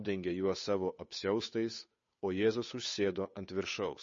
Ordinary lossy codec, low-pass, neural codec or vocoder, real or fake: MP3, 32 kbps; 7.2 kHz; codec, 16 kHz, 8 kbps, FunCodec, trained on Chinese and English, 25 frames a second; fake